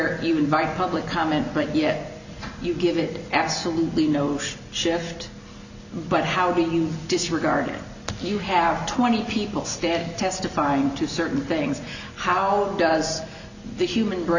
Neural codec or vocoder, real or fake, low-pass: none; real; 7.2 kHz